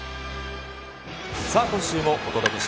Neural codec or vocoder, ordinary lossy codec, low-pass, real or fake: none; none; none; real